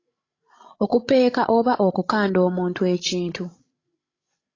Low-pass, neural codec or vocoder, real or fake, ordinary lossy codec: 7.2 kHz; codec, 16 kHz, 16 kbps, FreqCodec, larger model; fake; AAC, 32 kbps